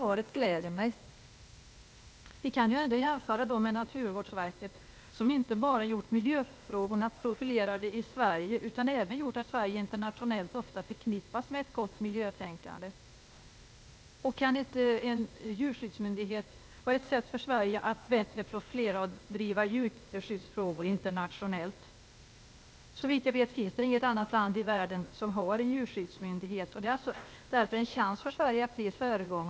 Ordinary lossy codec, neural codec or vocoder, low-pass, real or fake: none; codec, 16 kHz, 0.8 kbps, ZipCodec; none; fake